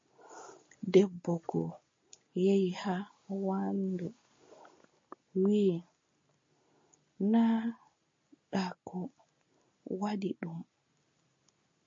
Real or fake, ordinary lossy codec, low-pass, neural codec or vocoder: real; MP3, 32 kbps; 7.2 kHz; none